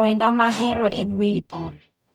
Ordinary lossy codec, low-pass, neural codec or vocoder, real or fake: none; 19.8 kHz; codec, 44.1 kHz, 0.9 kbps, DAC; fake